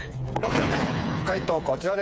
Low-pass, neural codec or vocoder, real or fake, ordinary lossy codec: none; codec, 16 kHz, 4 kbps, FreqCodec, smaller model; fake; none